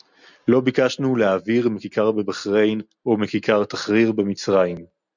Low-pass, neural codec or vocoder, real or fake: 7.2 kHz; none; real